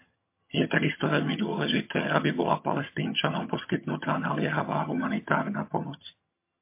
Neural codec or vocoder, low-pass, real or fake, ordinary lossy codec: vocoder, 22.05 kHz, 80 mel bands, HiFi-GAN; 3.6 kHz; fake; MP3, 24 kbps